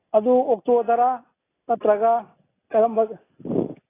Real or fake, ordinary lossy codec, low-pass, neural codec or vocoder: real; AAC, 16 kbps; 3.6 kHz; none